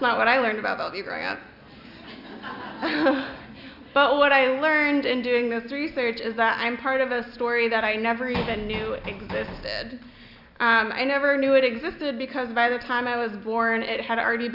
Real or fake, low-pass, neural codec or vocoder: real; 5.4 kHz; none